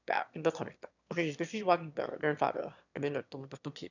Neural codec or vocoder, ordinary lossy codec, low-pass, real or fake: autoencoder, 22.05 kHz, a latent of 192 numbers a frame, VITS, trained on one speaker; none; 7.2 kHz; fake